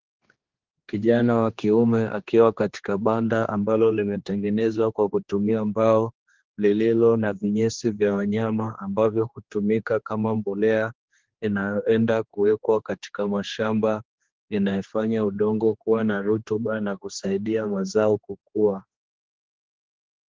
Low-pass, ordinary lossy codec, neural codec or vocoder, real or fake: 7.2 kHz; Opus, 16 kbps; codec, 16 kHz, 2 kbps, X-Codec, HuBERT features, trained on general audio; fake